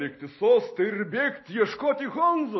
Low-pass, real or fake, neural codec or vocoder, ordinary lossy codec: 7.2 kHz; real; none; MP3, 24 kbps